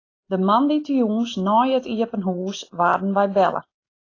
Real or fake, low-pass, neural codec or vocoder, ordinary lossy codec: real; 7.2 kHz; none; AAC, 32 kbps